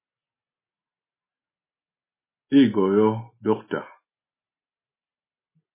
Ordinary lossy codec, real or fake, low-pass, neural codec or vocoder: MP3, 16 kbps; real; 3.6 kHz; none